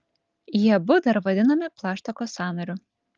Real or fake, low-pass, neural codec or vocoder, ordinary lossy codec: real; 7.2 kHz; none; Opus, 32 kbps